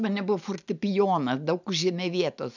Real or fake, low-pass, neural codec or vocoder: real; 7.2 kHz; none